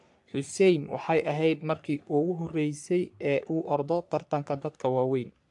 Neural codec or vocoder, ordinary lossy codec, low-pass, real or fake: codec, 44.1 kHz, 3.4 kbps, Pupu-Codec; AAC, 64 kbps; 10.8 kHz; fake